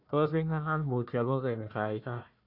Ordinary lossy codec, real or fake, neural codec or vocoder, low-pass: none; fake; codec, 16 kHz, 1 kbps, FunCodec, trained on Chinese and English, 50 frames a second; 5.4 kHz